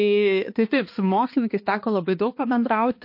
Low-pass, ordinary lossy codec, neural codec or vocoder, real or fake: 5.4 kHz; MP3, 32 kbps; codec, 16 kHz, 2 kbps, X-Codec, HuBERT features, trained on balanced general audio; fake